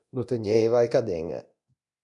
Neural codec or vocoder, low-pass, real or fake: codec, 24 kHz, 0.9 kbps, DualCodec; 10.8 kHz; fake